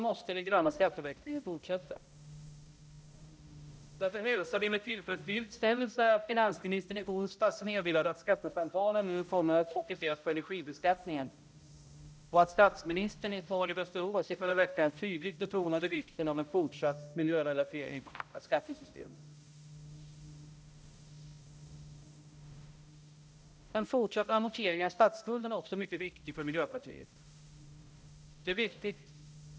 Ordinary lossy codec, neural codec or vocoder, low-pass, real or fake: none; codec, 16 kHz, 0.5 kbps, X-Codec, HuBERT features, trained on balanced general audio; none; fake